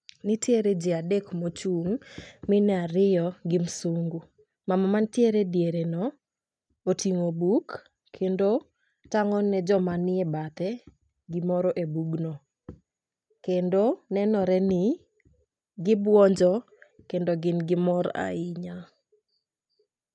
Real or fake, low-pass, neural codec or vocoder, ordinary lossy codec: real; 9.9 kHz; none; none